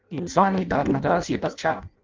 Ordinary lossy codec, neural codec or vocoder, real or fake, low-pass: Opus, 24 kbps; codec, 16 kHz in and 24 kHz out, 0.6 kbps, FireRedTTS-2 codec; fake; 7.2 kHz